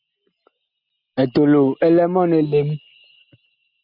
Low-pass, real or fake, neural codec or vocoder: 5.4 kHz; real; none